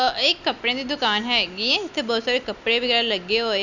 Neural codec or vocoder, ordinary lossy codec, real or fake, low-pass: none; none; real; 7.2 kHz